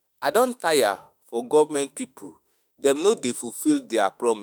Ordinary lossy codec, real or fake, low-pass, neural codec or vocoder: none; fake; none; autoencoder, 48 kHz, 32 numbers a frame, DAC-VAE, trained on Japanese speech